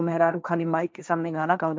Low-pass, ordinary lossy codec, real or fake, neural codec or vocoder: none; none; fake; codec, 16 kHz, 1.1 kbps, Voila-Tokenizer